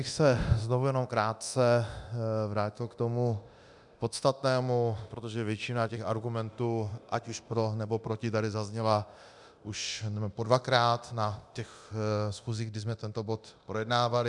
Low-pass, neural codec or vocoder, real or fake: 10.8 kHz; codec, 24 kHz, 0.9 kbps, DualCodec; fake